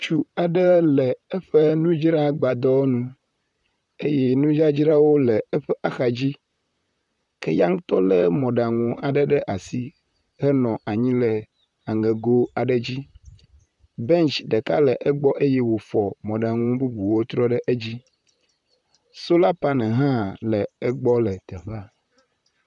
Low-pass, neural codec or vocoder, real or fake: 10.8 kHz; vocoder, 44.1 kHz, 128 mel bands, Pupu-Vocoder; fake